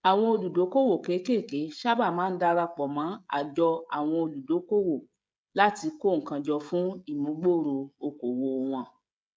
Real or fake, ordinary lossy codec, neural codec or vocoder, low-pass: fake; none; codec, 16 kHz, 16 kbps, FreqCodec, smaller model; none